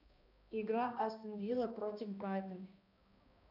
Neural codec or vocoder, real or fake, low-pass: codec, 16 kHz, 1 kbps, X-Codec, HuBERT features, trained on balanced general audio; fake; 5.4 kHz